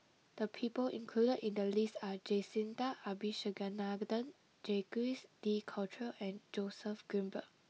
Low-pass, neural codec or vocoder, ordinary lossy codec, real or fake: none; none; none; real